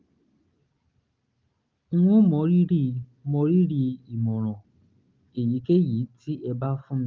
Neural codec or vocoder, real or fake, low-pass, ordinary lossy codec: none; real; 7.2 kHz; Opus, 32 kbps